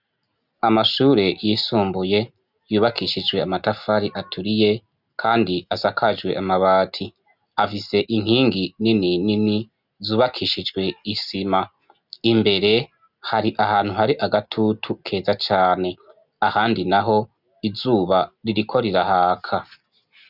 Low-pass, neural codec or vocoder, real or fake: 5.4 kHz; none; real